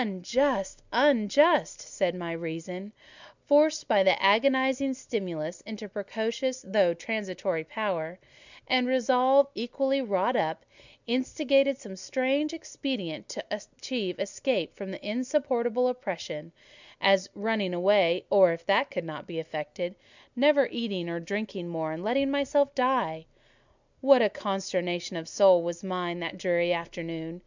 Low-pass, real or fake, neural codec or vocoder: 7.2 kHz; real; none